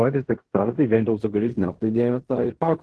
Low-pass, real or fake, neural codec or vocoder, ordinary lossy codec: 10.8 kHz; fake; codec, 16 kHz in and 24 kHz out, 0.4 kbps, LongCat-Audio-Codec, fine tuned four codebook decoder; Opus, 16 kbps